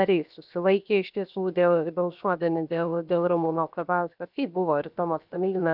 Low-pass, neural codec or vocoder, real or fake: 5.4 kHz; codec, 16 kHz, 0.7 kbps, FocalCodec; fake